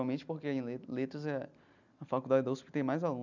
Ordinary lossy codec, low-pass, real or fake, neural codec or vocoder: none; 7.2 kHz; real; none